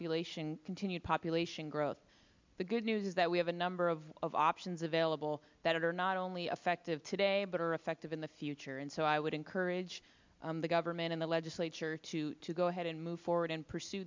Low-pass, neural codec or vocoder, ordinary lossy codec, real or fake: 7.2 kHz; none; MP3, 64 kbps; real